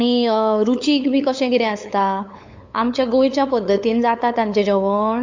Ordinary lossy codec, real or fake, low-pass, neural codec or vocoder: AAC, 48 kbps; fake; 7.2 kHz; codec, 16 kHz, 8 kbps, FunCodec, trained on LibriTTS, 25 frames a second